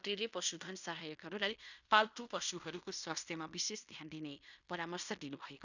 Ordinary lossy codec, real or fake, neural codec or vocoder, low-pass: none; fake; codec, 16 kHz in and 24 kHz out, 0.9 kbps, LongCat-Audio-Codec, fine tuned four codebook decoder; 7.2 kHz